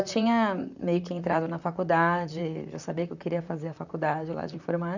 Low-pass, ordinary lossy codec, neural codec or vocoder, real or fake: 7.2 kHz; none; vocoder, 44.1 kHz, 128 mel bands, Pupu-Vocoder; fake